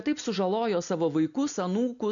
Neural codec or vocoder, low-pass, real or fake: none; 7.2 kHz; real